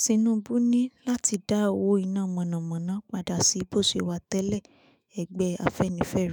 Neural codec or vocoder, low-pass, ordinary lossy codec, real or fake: autoencoder, 48 kHz, 128 numbers a frame, DAC-VAE, trained on Japanese speech; none; none; fake